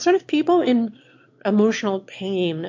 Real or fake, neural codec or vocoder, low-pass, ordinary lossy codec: fake; autoencoder, 22.05 kHz, a latent of 192 numbers a frame, VITS, trained on one speaker; 7.2 kHz; MP3, 48 kbps